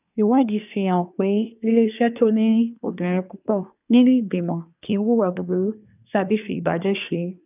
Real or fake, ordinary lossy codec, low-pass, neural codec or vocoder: fake; none; 3.6 kHz; codec, 24 kHz, 1 kbps, SNAC